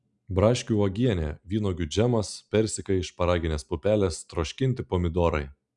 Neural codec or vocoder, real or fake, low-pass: none; real; 10.8 kHz